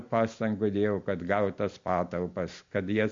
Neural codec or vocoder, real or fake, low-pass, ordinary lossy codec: none; real; 7.2 kHz; MP3, 48 kbps